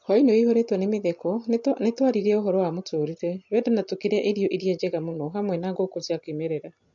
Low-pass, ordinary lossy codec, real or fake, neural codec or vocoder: 7.2 kHz; MP3, 48 kbps; real; none